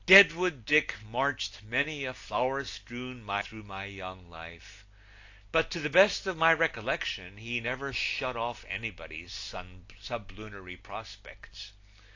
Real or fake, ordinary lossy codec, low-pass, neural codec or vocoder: real; AAC, 48 kbps; 7.2 kHz; none